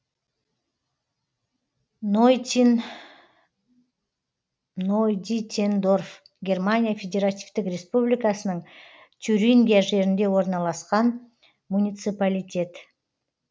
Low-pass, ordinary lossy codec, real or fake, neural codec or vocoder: none; none; real; none